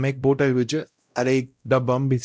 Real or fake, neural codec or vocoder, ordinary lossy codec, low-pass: fake; codec, 16 kHz, 0.5 kbps, X-Codec, WavLM features, trained on Multilingual LibriSpeech; none; none